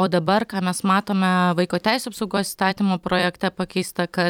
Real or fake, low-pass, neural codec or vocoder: fake; 19.8 kHz; vocoder, 44.1 kHz, 128 mel bands every 256 samples, BigVGAN v2